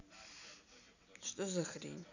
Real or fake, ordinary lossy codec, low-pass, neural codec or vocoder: real; none; 7.2 kHz; none